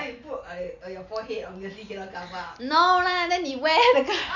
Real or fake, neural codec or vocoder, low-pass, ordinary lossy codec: real; none; 7.2 kHz; none